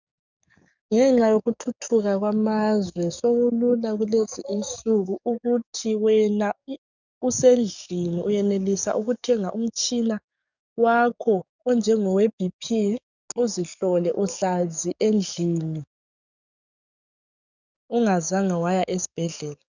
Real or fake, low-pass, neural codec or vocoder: fake; 7.2 kHz; codec, 44.1 kHz, 7.8 kbps, DAC